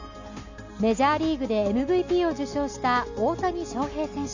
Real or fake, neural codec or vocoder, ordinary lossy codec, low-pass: real; none; none; 7.2 kHz